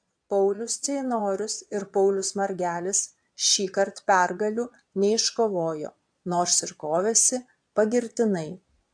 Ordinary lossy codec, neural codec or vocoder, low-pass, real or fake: AAC, 64 kbps; vocoder, 22.05 kHz, 80 mel bands, Vocos; 9.9 kHz; fake